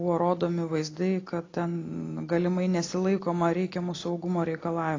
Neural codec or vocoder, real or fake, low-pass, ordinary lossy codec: none; real; 7.2 kHz; AAC, 32 kbps